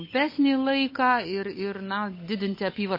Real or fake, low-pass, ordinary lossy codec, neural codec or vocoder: fake; 5.4 kHz; MP3, 24 kbps; codec, 16 kHz, 8 kbps, FunCodec, trained on Chinese and English, 25 frames a second